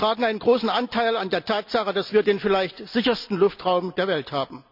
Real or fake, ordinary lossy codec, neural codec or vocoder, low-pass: real; none; none; 5.4 kHz